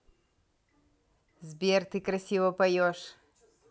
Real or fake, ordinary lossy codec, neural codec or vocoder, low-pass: real; none; none; none